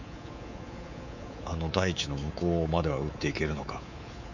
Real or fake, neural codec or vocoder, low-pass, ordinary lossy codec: fake; codec, 24 kHz, 3.1 kbps, DualCodec; 7.2 kHz; none